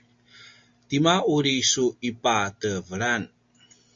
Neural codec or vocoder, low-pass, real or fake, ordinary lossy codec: none; 7.2 kHz; real; MP3, 48 kbps